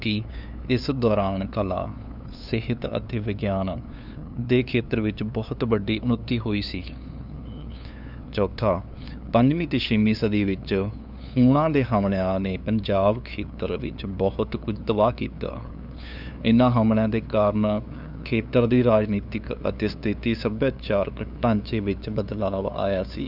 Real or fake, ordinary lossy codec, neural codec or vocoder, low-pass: fake; none; codec, 16 kHz, 2 kbps, FunCodec, trained on LibriTTS, 25 frames a second; 5.4 kHz